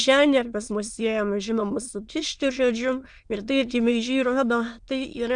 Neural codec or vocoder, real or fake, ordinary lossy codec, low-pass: autoencoder, 22.05 kHz, a latent of 192 numbers a frame, VITS, trained on many speakers; fake; Opus, 64 kbps; 9.9 kHz